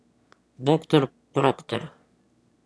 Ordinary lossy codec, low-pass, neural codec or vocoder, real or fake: none; none; autoencoder, 22.05 kHz, a latent of 192 numbers a frame, VITS, trained on one speaker; fake